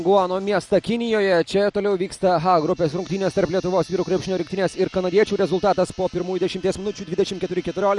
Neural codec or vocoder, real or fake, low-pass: none; real; 10.8 kHz